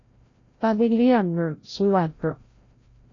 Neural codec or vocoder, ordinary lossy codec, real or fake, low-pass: codec, 16 kHz, 0.5 kbps, FreqCodec, larger model; AAC, 32 kbps; fake; 7.2 kHz